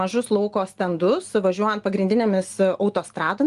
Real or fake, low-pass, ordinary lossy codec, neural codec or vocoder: real; 10.8 kHz; Opus, 24 kbps; none